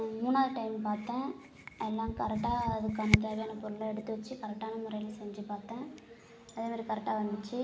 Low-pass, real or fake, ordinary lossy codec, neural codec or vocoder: none; real; none; none